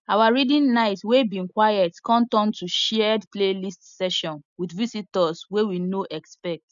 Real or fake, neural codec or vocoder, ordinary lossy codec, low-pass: real; none; none; 7.2 kHz